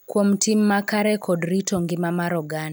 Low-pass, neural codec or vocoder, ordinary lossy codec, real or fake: none; none; none; real